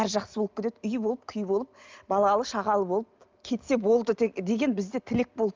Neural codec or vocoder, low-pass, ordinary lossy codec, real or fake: none; 7.2 kHz; Opus, 24 kbps; real